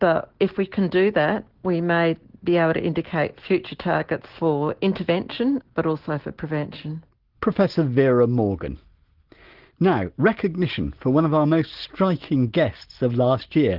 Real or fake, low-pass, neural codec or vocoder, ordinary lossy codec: real; 5.4 kHz; none; Opus, 16 kbps